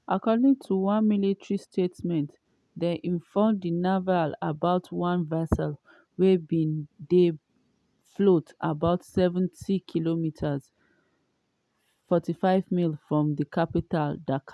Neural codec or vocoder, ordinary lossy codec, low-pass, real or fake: none; none; none; real